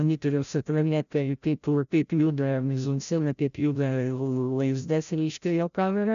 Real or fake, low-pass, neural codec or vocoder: fake; 7.2 kHz; codec, 16 kHz, 0.5 kbps, FreqCodec, larger model